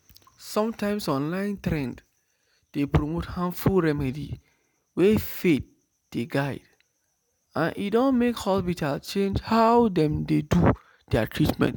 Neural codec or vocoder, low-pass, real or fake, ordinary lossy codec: none; none; real; none